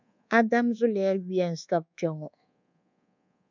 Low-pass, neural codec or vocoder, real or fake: 7.2 kHz; codec, 24 kHz, 1.2 kbps, DualCodec; fake